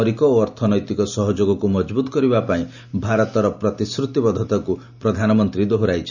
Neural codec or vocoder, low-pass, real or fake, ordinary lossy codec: none; 7.2 kHz; real; none